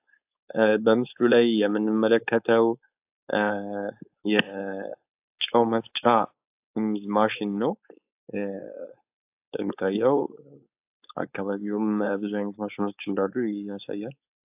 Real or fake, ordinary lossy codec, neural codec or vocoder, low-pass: fake; AAC, 32 kbps; codec, 16 kHz, 4.8 kbps, FACodec; 3.6 kHz